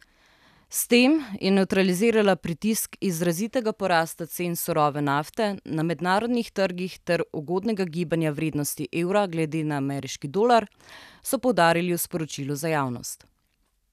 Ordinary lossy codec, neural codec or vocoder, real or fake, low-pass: none; none; real; 14.4 kHz